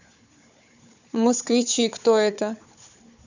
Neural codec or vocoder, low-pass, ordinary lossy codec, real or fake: codec, 16 kHz, 4 kbps, FunCodec, trained on Chinese and English, 50 frames a second; 7.2 kHz; none; fake